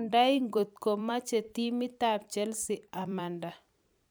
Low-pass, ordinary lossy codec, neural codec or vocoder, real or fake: none; none; vocoder, 44.1 kHz, 128 mel bands every 256 samples, BigVGAN v2; fake